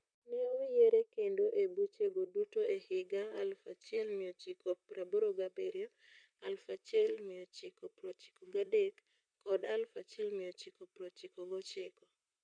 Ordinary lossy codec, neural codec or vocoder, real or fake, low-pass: none; vocoder, 44.1 kHz, 128 mel bands, Pupu-Vocoder; fake; 9.9 kHz